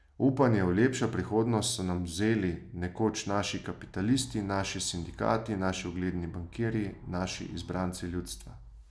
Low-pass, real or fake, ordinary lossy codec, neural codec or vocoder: none; real; none; none